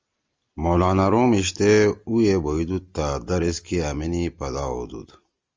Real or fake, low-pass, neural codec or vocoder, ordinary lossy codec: real; 7.2 kHz; none; Opus, 32 kbps